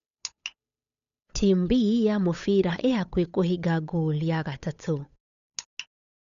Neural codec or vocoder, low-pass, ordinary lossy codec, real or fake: codec, 16 kHz, 8 kbps, FunCodec, trained on Chinese and English, 25 frames a second; 7.2 kHz; none; fake